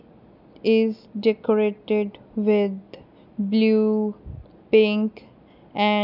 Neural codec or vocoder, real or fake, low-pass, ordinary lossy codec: none; real; 5.4 kHz; none